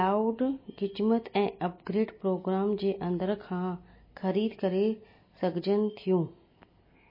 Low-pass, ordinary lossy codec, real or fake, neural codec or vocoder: 5.4 kHz; MP3, 24 kbps; real; none